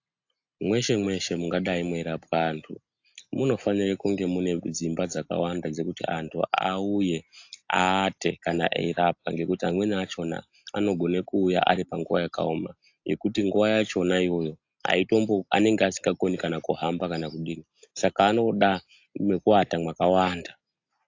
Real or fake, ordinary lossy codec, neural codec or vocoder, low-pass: real; AAC, 48 kbps; none; 7.2 kHz